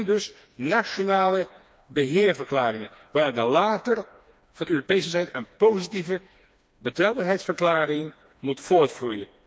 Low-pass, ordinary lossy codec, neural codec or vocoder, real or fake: none; none; codec, 16 kHz, 2 kbps, FreqCodec, smaller model; fake